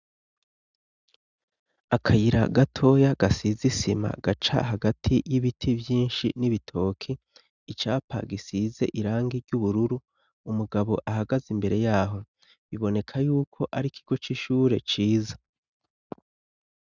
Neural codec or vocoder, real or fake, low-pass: none; real; 7.2 kHz